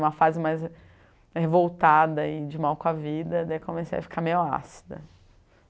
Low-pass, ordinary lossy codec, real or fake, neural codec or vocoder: none; none; real; none